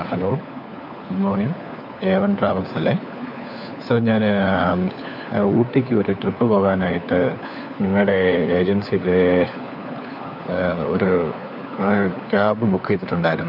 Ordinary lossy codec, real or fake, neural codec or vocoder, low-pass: none; fake; codec, 16 kHz, 4 kbps, FunCodec, trained on LibriTTS, 50 frames a second; 5.4 kHz